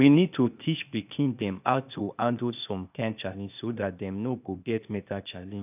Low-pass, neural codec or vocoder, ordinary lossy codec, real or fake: 3.6 kHz; codec, 16 kHz, 0.8 kbps, ZipCodec; none; fake